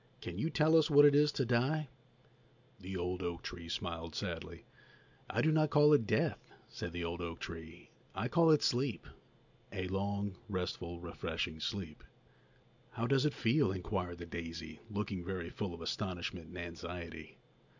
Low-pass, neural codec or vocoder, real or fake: 7.2 kHz; none; real